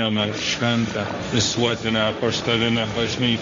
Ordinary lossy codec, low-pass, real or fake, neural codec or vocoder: MP3, 48 kbps; 7.2 kHz; fake; codec, 16 kHz, 1.1 kbps, Voila-Tokenizer